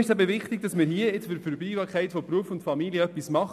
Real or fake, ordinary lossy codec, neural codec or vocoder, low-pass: real; none; none; 14.4 kHz